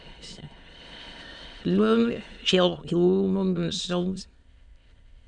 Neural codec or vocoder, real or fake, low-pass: autoencoder, 22.05 kHz, a latent of 192 numbers a frame, VITS, trained on many speakers; fake; 9.9 kHz